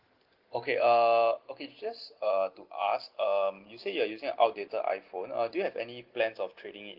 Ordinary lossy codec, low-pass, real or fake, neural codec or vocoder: Opus, 16 kbps; 5.4 kHz; real; none